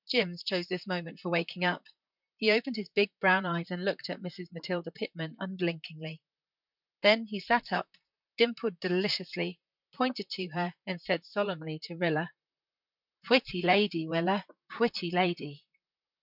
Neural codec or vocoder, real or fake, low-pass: vocoder, 44.1 kHz, 128 mel bands, Pupu-Vocoder; fake; 5.4 kHz